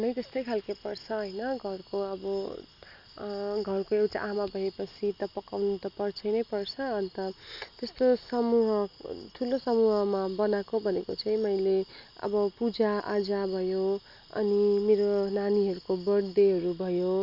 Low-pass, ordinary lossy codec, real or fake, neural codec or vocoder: 5.4 kHz; none; real; none